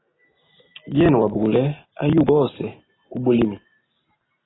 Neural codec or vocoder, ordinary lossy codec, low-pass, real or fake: none; AAC, 16 kbps; 7.2 kHz; real